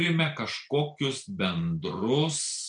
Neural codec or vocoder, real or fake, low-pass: none; real; 9.9 kHz